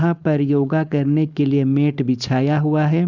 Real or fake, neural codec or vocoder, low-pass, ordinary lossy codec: fake; codec, 16 kHz, 4.8 kbps, FACodec; 7.2 kHz; none